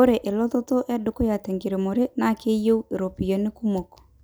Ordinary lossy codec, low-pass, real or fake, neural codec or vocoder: none; none; real; none